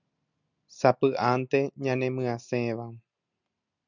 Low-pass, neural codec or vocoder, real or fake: 7.2 kHz; none; real